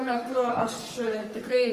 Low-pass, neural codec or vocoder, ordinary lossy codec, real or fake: 14.4 kHz; codec, 44.1 kHz, 3.4 kbps, Pupu-Codec; Opus, 32 kbps; fake